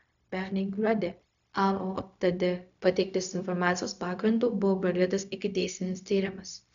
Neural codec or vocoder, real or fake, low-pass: codec, 16 kHz, 0.4 kbps, LongCat-Audio-Codec; fake; 7.2 kHz